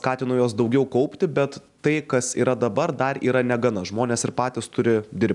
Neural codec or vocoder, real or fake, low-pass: none; real; 10.8 kHz